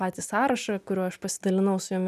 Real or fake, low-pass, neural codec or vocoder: real; 14.4 kHz; none